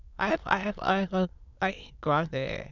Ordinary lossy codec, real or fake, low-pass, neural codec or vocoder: none; fake; 7.2 kHz; autoencoder, 22.05 kHz, a latent of 192 numbers a frame, VITS, trained on many speakers